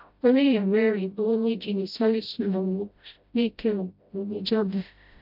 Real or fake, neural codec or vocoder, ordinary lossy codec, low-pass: fake; codec, 16 kHz, 0.5 kbps, FreqCodec, smaller model; MP3, 48 kbps; 5.4 kHz